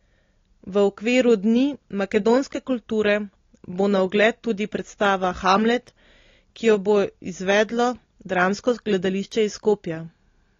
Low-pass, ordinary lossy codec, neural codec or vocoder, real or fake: 7.2 kHz; AAC, 32 kbps; none; real